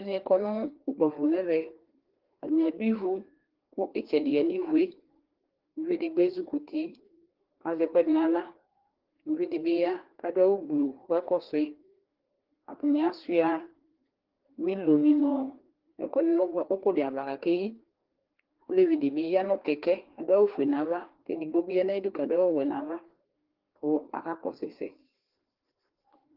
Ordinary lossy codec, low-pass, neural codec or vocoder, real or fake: Opus, 16 kbps; 5.4 kHz; codec, 16 kHz, 2 kbps, FreqCodec, larger model; fake